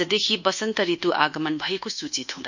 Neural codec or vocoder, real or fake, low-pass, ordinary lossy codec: codec, 24 kHz, 1.2 kbps, DualCodec; fake; 7.2 kHz; none